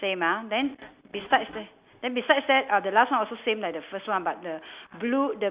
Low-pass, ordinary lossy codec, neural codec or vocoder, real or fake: 3.6 kHz; Opus, 64 kbps; none; real